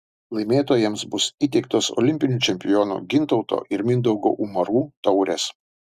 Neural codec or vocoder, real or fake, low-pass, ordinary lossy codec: none; real; 14.4 kHz; Opus, 64 kbps